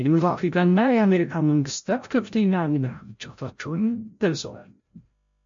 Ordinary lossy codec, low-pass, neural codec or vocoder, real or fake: MP3, 48 kbps; 7.2 kHz; codec, 16 kHz, 0.5 kbps, FreqCodec, larger model; fake